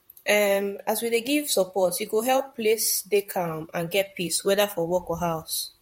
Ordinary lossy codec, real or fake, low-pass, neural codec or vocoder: MP3, 64 kbps; fake; 19.8 kHz; vocoder, 44.1 kHz, 128 mel bands, Pupu-Vocoder